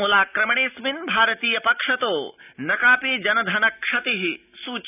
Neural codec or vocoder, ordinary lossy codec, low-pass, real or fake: none; none; 3.6 kHz; real